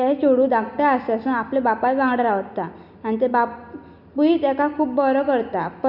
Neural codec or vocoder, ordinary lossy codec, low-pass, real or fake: none; none; 5.4 kHz; real